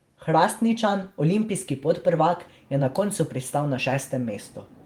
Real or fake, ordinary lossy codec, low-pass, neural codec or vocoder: fake; Opus, 24 kbps; 19.8 kHz; vocoder, 48 kHz, 128 mel bands, Vocos